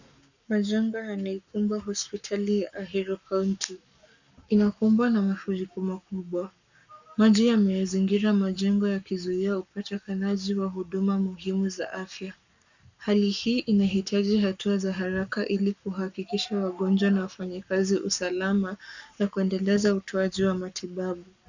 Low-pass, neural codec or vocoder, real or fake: 7.2 kHz; codec, 44.1 kHz, 7.8 kbps, Pupu-Codec; fake